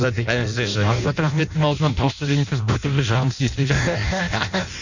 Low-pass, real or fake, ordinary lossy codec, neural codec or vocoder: 7.2 kHz; fake; none; codec, 16 kHz in and 24 kHz out, 0.6 kbps, FireRedTTS-2 codec